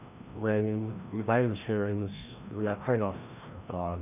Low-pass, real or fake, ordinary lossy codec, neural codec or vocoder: 3.6 kHz; fake; none; codec, 16 kHz, 1 kbps, FreqCodec, larger model